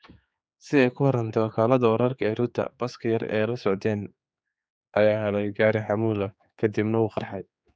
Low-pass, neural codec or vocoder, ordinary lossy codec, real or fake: none; codec, 16 kHz, 4 kbps, X-Codec, HuBERT features, trained on general audio; none; fake